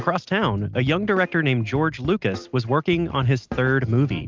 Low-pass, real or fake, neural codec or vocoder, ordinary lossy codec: 7.2 kHz; real; none; Opus, 32 kbps